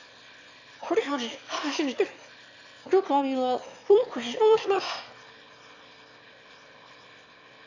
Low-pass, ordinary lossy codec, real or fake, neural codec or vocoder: 7.2 kHz; AAC, 48 kbps; fake; autoencoder, 22.05 kHz, a latent of 192 numbers a frame, VITS, trained on one speaker